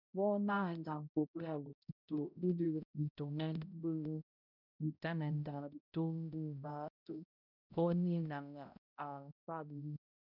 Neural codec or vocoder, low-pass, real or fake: codec, 16 kHz, 0.5 kbps, X-Codec, HuBERT features, trained on balanced general audio; 5.4 kHz; fake